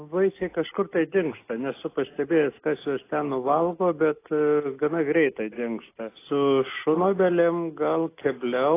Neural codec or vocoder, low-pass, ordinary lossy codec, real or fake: none; 3.6 kHz; AAC, 24 kbps; real